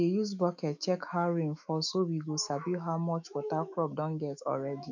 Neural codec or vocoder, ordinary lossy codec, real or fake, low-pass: autoencoder, 48 kHz, 128 numbers a frame, DAC-VAE, trained on Japanese speech; none; fake; 7.2 kHz